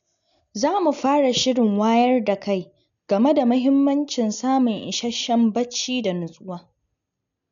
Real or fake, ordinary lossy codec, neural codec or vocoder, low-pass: real; none; none; 7.2 kHz